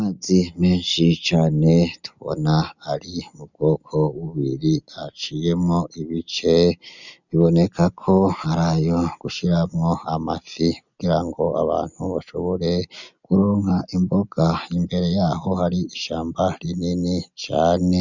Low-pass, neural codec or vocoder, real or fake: 7.2 kHz; none; real